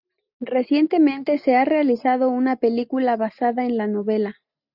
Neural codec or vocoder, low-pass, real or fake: none; 5.4 kHz; real